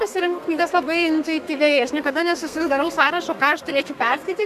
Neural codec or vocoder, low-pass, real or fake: codec, 32 kHz, 1.9 kbps, SNAC; 14.4 kHz; fake